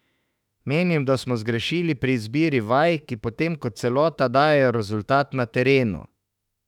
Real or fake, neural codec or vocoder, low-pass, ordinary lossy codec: fake; autoencoder, 48 kHz, 32 numbers a frame, DAC-VAE, trained on Japanese speech; 19.8 kHz; none